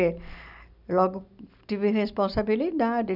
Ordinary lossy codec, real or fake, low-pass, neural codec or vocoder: none; real; 5.4 kHz; none